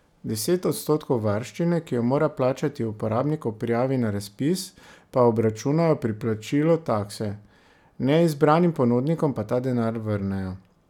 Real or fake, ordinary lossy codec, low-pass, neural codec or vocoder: real; none; 19.8 kHz; none